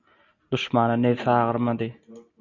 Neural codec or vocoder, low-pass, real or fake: none; 7.2 kHz; real